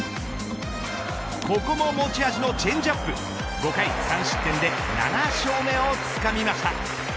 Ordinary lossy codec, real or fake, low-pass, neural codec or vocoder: none; real; none; none